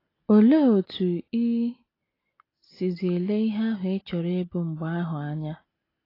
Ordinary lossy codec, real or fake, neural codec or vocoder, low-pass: AAC, 24 kbps; real; none; 5.4 kHz